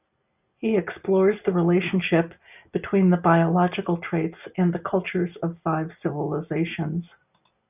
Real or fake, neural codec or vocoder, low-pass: real; none; 3.6 kHz